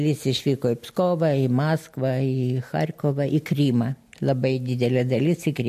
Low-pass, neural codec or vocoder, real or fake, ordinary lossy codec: 14.4 kHz; none; real; MP3, 64 kbps